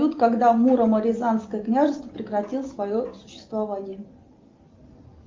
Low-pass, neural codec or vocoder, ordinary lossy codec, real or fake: 7.2 kHz; none; Opus, 32 kbps; real